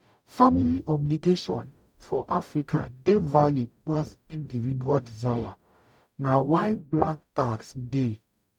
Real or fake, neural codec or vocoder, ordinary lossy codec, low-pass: fake; codec, 44.1 kHz, 0.9 kbps, DAC; none; 19.8 kHz